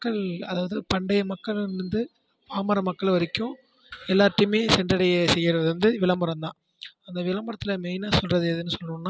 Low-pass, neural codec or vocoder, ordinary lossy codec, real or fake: none; none; none; real